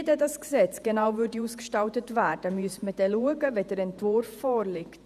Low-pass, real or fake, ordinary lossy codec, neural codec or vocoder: 14.4 kHz; fake; none; vocoder, 48 kHz, 128 mel bands, Vocos